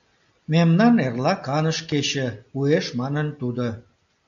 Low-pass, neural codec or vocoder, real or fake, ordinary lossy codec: 7.2 kHz; none; real; MP3, 96 kbps